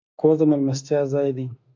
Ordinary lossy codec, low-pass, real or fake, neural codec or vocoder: AAC, 48 kbps; 7.2 kHz; fake; autoencoder, 48 kHz, 32 numbers a frame, DAC-VAE, trained on Japanese speech